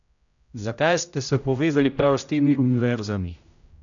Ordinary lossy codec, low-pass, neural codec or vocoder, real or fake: none; 7.2 kHz; codec, 16 kHz, 0.5 kbps, X-Codec, HuBERT features, trained on general audio; fake